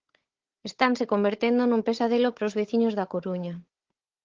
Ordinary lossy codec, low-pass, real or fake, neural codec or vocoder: Opus, 16 kbps; 7.2 kHz; fake; codec, 16 kHz, 16 kbps, FunCodec, trained on Chinese and English, 50 frames a second